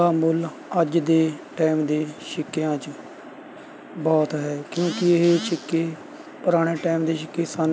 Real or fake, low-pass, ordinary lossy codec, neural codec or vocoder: real; none; none; none